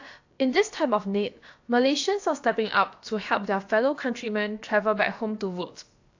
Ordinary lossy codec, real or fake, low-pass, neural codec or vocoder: AAC, 48 kbps; fake; 7.2 kHz; codec, 16 kHz, about 1 kbps, DyCAST, with the encoder's durations